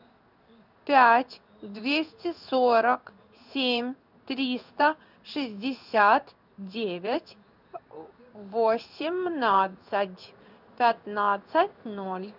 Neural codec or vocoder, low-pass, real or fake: codec, 16 kHz in and 24 kHz out, 1 kbps, XY-Tokenizer; 5.4 kHz; fake